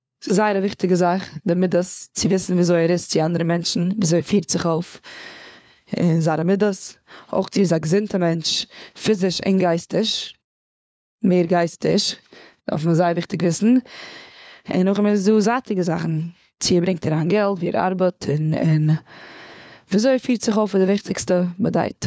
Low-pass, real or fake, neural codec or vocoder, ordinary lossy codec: none; fake; codec, 16 kHz, 4 kbps, FunCodec, trained on LibriTTS, 50 frames a second; none